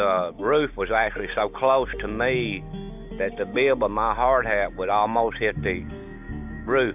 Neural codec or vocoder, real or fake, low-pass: none; real; 3.6 kHz